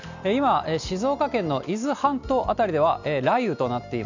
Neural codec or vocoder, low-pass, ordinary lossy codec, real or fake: none; 7.2 kHz; none; real